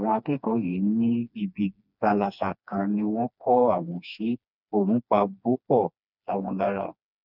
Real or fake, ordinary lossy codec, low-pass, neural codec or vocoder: fake; none; 5.4 kHz; codec, 16 kHz, 2 kbps, FreqCodec, smaller model